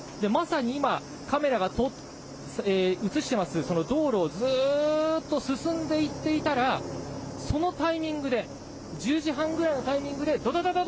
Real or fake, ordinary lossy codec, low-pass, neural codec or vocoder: real; none; none; none